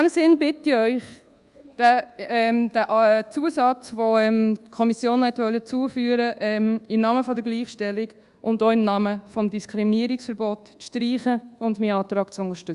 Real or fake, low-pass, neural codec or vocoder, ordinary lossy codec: fake; 10.8 kHz; codec, 24 kHz, 1.2 kbps, DualCodec; Opus, 64 kbps